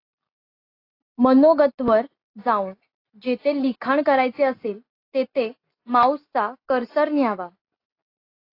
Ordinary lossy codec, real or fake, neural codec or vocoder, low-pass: AAC, 32 kbps; real; none; 5.4 kHz